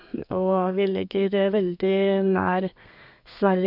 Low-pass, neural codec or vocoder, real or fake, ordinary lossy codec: 5.4 kHz; codec, 32 kHz, 1.9 kbps, SNAC; fake; none